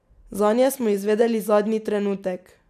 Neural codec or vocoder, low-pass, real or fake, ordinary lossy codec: none; 14.4 kHz; real; none